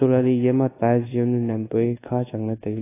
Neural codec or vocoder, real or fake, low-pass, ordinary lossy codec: codec, 24 kHz, 1.2 kbps, DualCodec; fake; 3.6 kHz; AAC, 16 kbps